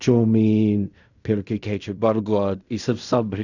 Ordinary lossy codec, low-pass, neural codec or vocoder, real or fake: none; 7.2 kHz; codec, 16 kHz in and 24 kHz out, 0.4 kbps, LongCat-Audio-Codec, fine tuned four codebook decoder; fake